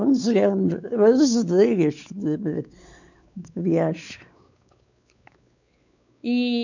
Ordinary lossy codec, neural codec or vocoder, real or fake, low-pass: none; codec, 16 kHz, 4 kbps, X-Codec, WavLM features, trained on Multilingual LibriSpeech; fake; 7.2 kHz